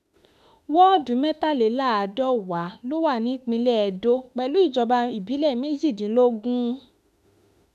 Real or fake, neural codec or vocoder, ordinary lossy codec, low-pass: fake; autoencoder, 48 kHz, 32 numbers a frame, DAC-VAE, trained on Japanese speech; none; 14.4 kHz